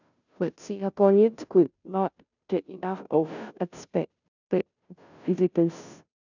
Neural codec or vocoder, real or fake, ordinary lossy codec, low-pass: codec, 16 kHz, 0.5 kbps, FunCodec, trained on Chinese and English, 25 frames a second; fake; none; 7.2 kHz